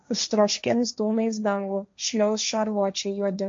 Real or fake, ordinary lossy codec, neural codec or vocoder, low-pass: fake; MP3, 48 kbps; codec, 16 kHz, 1.1 kbps, Voila-Tokenizer; 7.2 kHz